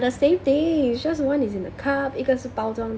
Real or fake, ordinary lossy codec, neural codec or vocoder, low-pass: real; none; none; none